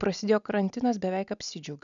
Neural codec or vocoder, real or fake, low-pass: none; real; 7.2 kHz